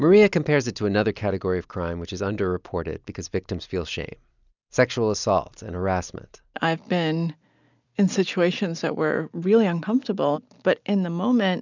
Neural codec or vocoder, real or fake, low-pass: none; real; 7.2 kHz